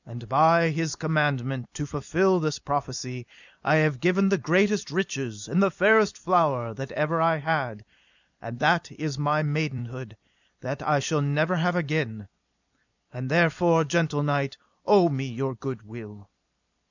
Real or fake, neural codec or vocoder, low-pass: fake; vocoder, 44.1 kHz, 128 mel bands every 256 samples, BigVGAN v2; 7.2 kHz